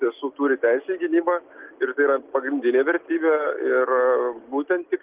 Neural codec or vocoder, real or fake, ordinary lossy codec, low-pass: none; real; Opus, 32 kbps; 3.6 kHz